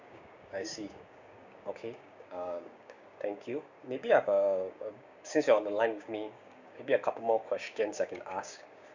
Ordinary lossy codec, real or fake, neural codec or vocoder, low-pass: none; fake; codec, 16 kHz, 6 kbps, DAC; 7.2 kHz